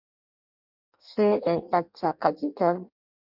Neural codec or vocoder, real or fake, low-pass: codec, 16 kHz in and 24 kHz out, 0.6 kbps, FireRedTTS-2 codec; fake; 5.4 kHz